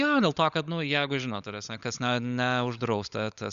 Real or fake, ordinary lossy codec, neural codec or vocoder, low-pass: fake; Opus, 64 kbps; codec, 16 kHz, 16 kbps, FunCodec, trained on LibriTTS, 50 frames a second; 7.2 kHz